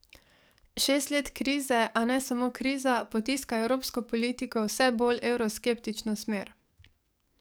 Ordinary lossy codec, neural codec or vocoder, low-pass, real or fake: none; codec, 44.1 kHz, 7.8 kbps, DAC; none; fake